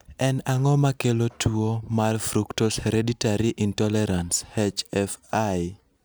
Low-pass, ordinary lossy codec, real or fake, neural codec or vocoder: none; none; real; none